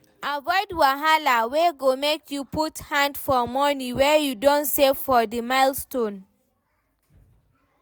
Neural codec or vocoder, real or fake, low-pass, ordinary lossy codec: none; real; none; none